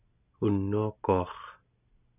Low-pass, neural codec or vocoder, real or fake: 3.6 kHz; none; real